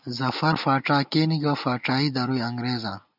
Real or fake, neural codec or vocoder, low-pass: real; none; 5.4 kHz